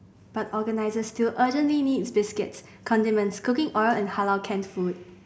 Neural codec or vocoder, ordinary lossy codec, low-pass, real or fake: none; none; none; real